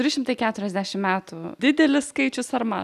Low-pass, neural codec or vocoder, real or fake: 14.4 kHz; none; real